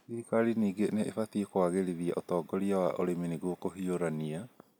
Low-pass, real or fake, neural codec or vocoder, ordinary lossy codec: none; real; none; none